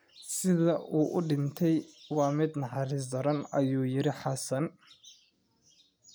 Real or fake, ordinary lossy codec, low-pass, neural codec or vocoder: real; none; none; none